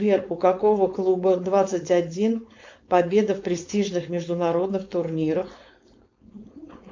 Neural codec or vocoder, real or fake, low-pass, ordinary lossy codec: codec, 16 kHz, 4.8 kbps, FACodec; fake; 7.2 kHz; MP3, 48 kbps